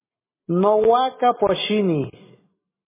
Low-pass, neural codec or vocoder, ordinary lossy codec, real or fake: 3.6 kHz; none; MP3, 16 kbps; real